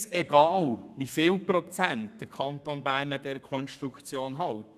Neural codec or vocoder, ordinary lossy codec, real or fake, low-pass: codec, 32 kHz, 1.9 kbps, SNAC; none; fake; 14.4 kHz